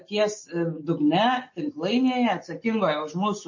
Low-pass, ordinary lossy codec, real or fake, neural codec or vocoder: 7.2 kHz; MP3, 32 kbps; real; none